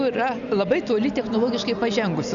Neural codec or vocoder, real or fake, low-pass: none; real; 7.2 kHz